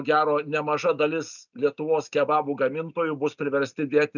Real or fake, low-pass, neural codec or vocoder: real; 7.2 kHz; none